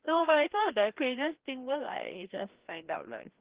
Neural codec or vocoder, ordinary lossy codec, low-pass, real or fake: codec, 16 kHz, 1 kbps, FreqCodec, larger model; Opus, 16 kbps; 3.6 kHz; fake